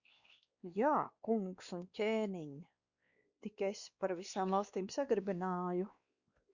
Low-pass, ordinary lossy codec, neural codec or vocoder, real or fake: 7.2 kHz; Opus, 64 kbps; codec, 16 kHz, 2 kbps, X-Codec, WavLM features, trained on Multilingual LibriSpeech; fake